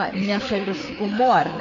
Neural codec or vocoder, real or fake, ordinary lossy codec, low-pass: codec, 16 kHz, 4 kbps, FreqCodec, larger model; fake; MP3, 48 kbps; 7.2 kHz